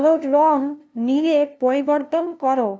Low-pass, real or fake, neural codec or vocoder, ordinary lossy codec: none; fake; codec, 16 kHz, 0.5 kbps, FunCodec, trained on LibriTTS, 25 frames a second; none